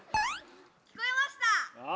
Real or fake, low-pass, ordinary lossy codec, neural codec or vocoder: real; none; none; none